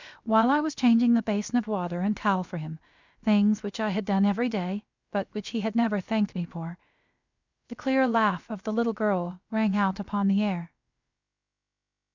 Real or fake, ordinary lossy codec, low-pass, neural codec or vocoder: fake; Opus, 64 kbps; 7.2 kHz; codec, 16 kHz, about 1 kbps, DyCAST, with the encoder's durations